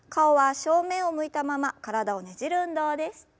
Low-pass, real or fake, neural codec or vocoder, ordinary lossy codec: none; real; none; none